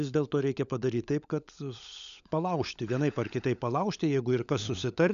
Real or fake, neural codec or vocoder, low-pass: fake; codec, 16 kHz, 16 kbps, FunCodec, trained on LibriTTS, 50 frames a second; 7.2 kHz